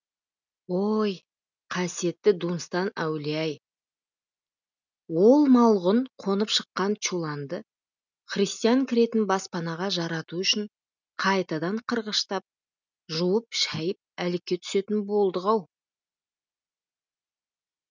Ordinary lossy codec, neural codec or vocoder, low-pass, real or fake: none; none; 7.2 kHz; real